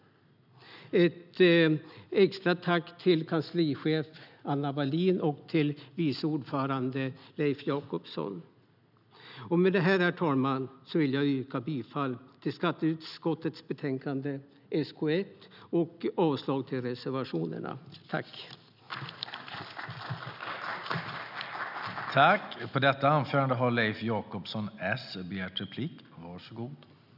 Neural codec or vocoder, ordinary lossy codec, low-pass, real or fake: none; none; 5.4 kHz; real